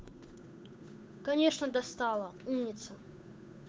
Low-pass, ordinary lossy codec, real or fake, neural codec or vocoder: none; none; fake; codec, 16 kHz, 8 kbps, FunCodec, trained on Chinese and English, 25 frames a second